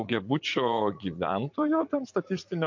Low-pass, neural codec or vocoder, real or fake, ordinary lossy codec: 7.2 kHz; vocoder, 44.1 kHz, 80 mel bands, Vocos; fake; MP3, 48 kbps